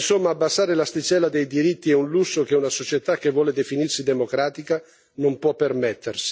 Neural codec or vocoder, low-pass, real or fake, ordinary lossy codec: none; none; real; none